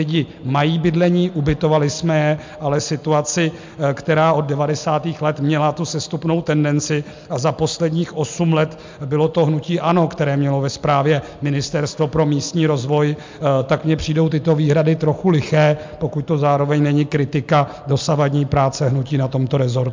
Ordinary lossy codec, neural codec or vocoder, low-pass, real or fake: MP3, 64 kbps; none; 7.2 kHz; real